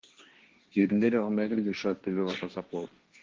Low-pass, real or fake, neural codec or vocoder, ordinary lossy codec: 7.2 kHz; fake; codec, 16 kHz, 1.1 kbps, Voila-Tokenizer; Opus, 16 kbps